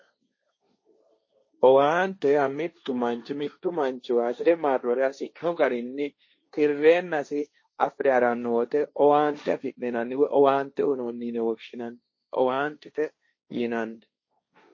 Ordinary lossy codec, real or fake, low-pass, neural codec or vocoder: MP3, 32 kbps; fake; 7.2 kHz; codec, 16 kHz, 1.1 kbps, Voila-Tokenizer